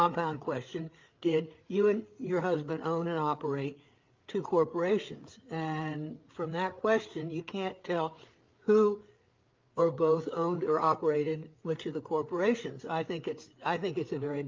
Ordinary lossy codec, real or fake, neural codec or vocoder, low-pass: Opus, 32 kbps; fake; codec, 16 kHz, 8 kbps, FreqCodec, larger model; 7.2 kHz